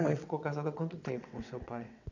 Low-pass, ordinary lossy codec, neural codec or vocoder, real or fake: 7.2 kHz; none; vocoder, 22.05 kHz, 80 mel bands, WaveNeXt; fake